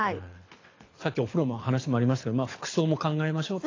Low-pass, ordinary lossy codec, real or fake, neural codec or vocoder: 7.2 kHz; AAC, 32 kbps; fake; codec, 24 kHz, 6 kbps, HILCodec